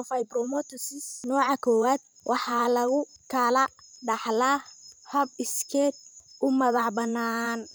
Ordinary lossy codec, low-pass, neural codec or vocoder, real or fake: none; none; vocoder, 44.1 kHz, 128 mel bands every 512 samples, BigVGAN v2; fake